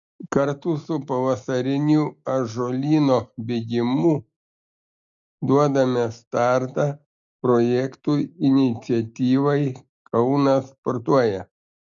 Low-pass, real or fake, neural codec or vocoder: 7.2 kHz; real; none